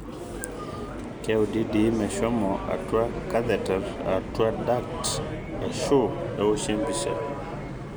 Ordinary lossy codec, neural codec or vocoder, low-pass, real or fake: none; none; none; real